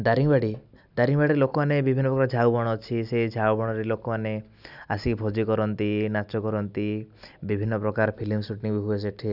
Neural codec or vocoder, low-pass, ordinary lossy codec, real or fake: none; 5.4 kHz; none; real